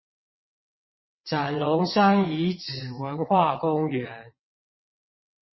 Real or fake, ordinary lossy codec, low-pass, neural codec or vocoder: fake; MP3, 24 kbps; 7.2 kHz; vocoder, 22.05 kHz, 80 mel bands, WaveNeXt